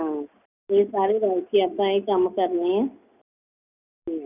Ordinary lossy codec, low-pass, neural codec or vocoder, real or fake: none; 3.6 kHz; none; real